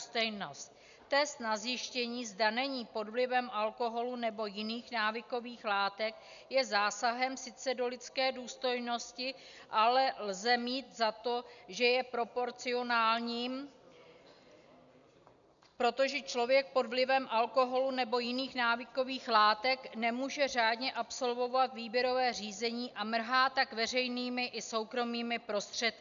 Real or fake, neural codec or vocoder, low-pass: real; none; 7.2 kHz